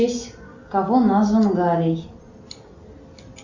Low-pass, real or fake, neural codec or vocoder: 7.2 kHz; real; none